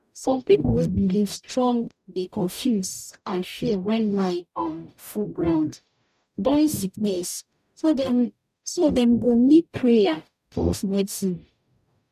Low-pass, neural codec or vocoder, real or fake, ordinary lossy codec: 14.4 kHz; codec, 44.1 kHz, 0.9 kbps, DAC; fake; none